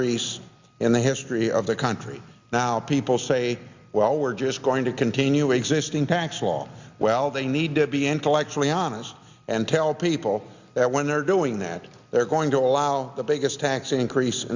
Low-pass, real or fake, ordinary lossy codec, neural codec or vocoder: 7.2 kHz; real; Opus, 64 kbps; none